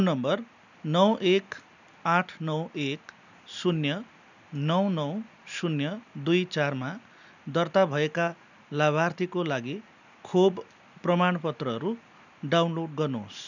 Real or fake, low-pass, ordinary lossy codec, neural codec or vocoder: real; 7.2 kHz; none; none